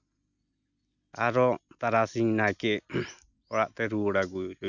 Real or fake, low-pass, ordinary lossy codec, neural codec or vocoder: real; 7.2 kHz; none; none